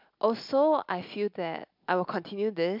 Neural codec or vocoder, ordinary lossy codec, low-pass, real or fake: none; MP3, 48 kbps; 5.4 kHz; real